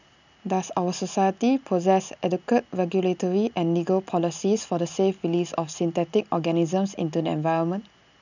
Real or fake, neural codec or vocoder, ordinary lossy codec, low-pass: real; none; none; 7.2 kHz